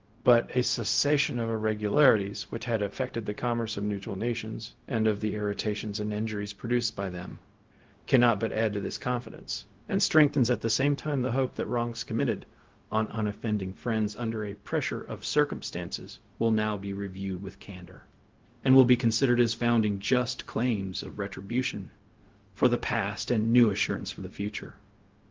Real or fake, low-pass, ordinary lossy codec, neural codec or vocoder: fake; 7.2 kHz; Opus, 16 kbps; codec, 16 kHz, 0.4 kbps, LongCat-Audio-Codec